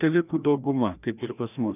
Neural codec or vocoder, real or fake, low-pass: codec, 16 kHz, 1 kbps, FreqCodec, larger model; fake; 3.6 kHz